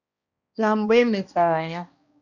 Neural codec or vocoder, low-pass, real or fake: codec, 16 kHz, 1 kbps, X-Codec, HuBERT features, trained on balanced general audio; 7.2 kHz; fake